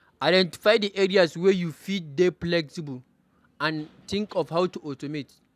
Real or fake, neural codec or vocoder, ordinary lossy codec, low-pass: real; none; none; 14.4 kHz